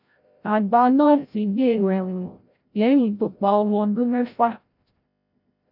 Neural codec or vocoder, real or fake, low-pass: codec, 16 kHz, 0.5 kbps, FreqCodec, larger model; fake; 5.4 kHz